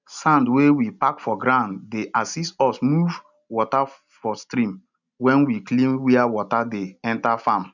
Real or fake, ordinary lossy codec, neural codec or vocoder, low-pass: real; none; none; 7.2 kHz